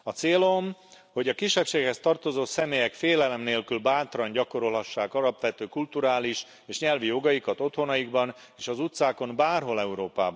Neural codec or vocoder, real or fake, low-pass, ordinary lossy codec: none; real; none; none